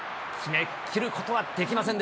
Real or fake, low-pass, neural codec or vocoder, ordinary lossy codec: real; none; none; none